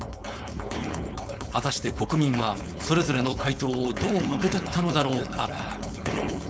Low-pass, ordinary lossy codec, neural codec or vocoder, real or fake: none; none; codec, 16 kHz, 4.8 kbps, FACodec; fake